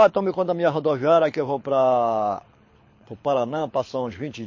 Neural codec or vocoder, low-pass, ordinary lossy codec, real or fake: codec, 24 kHz, 6 kbps, HILCodec; 7.2 kHz; MP3, 32 kbps; fake